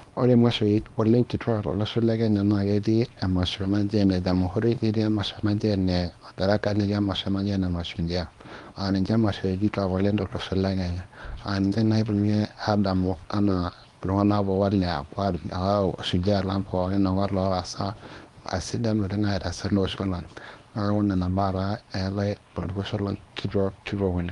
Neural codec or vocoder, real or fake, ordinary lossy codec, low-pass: codec, 24 kHz, 0.9 kbps, WavTokenizer, small release; fake; Opus, 32 kbps; 10.8 kHz